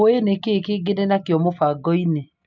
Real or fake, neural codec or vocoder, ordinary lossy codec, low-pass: real; none; MP3, 64 kbps; 7.2 kHz